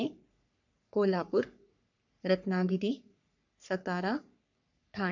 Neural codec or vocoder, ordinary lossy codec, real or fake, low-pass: codec, 44.1 kHz, 3.4 kbps, Pupu-Codec; none; fake; 7.2 kHz